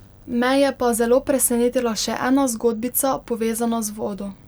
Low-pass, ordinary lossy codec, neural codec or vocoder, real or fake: none; none; none; real